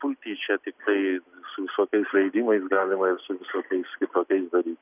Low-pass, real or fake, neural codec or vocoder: 3.6 kHz; real; none